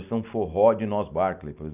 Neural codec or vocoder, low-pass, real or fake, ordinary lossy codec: none; 3.6 kHz; real; none